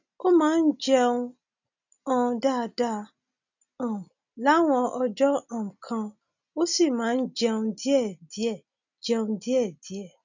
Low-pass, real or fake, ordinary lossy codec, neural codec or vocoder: 7.2 kHz; real; none; none